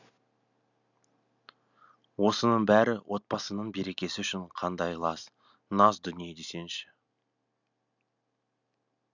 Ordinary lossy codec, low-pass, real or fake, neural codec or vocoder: none; 7.2 kHz; real; none